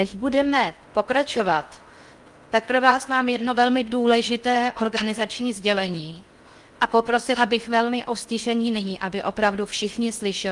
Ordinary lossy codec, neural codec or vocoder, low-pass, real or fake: Opus, 32 kbps; codec, 16 kHz in and 24 kHz out, 0.6 kbps, FocalCodec, streaming, 4096 codes; 10.8 kHz; fake